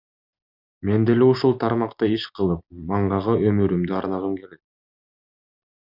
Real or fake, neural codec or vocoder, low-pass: real; none; 5.4 kHz